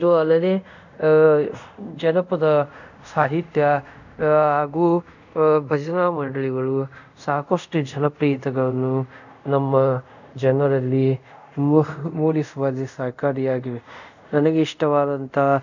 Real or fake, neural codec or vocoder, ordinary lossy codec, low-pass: fake; codec, 24 kHz, 0.5 kbps, DualCodec; none; 7.2 kHz